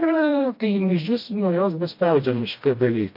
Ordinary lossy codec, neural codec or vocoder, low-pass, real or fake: MP3, 32 kbps; codec, 16 kHz, 1 kbps, FreqCodec, smaller model; 5.4 kHz; fake